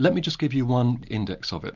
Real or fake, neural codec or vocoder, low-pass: real; none; 7.2 kHz